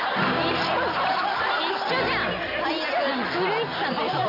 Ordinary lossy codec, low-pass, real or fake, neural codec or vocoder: AAC, 32 kbps; 5.4 kHz; real; none